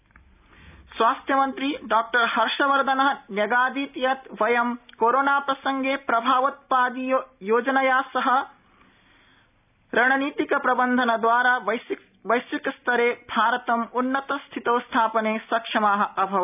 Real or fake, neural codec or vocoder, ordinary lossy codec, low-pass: real; none; none; 3.6 kHz